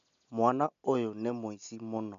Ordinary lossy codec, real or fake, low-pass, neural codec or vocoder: none; real; 7.2 kHz; none